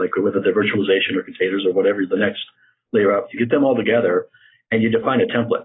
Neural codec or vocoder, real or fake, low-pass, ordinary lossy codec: none; real; 7.2 kHz; AAC, 16 kbps